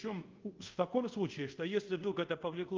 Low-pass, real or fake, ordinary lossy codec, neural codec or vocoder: 7.2 kHz; fake; Opus, 24 kbps; codec, 24 kHz, 0.5 kbps, DualCodec